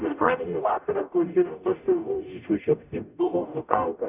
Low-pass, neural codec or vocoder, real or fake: 3.6 kHz; codec, 44.1 kHz, 0.9 kbps, DAC; fake